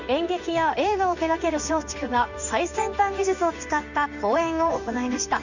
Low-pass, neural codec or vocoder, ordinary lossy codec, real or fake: 7.2 kHz; codec, 16 kHz in and 24 kHz out, 1 kbps, XY-Tokenizer; none; fake